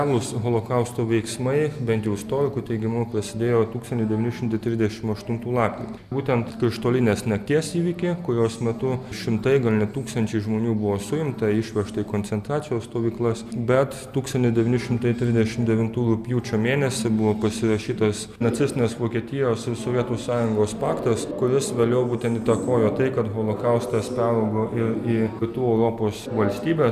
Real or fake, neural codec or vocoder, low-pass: real; none; 14.4 kHz